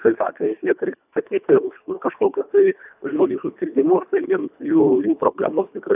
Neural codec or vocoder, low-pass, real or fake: codec, 24 kHz, 1.5 kbps, HILCodec; 3.6 kHz; fake